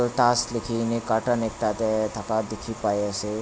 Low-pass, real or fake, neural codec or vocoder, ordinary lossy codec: none; real; none; none